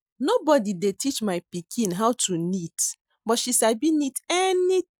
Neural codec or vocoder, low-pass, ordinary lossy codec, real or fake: none; none; none; real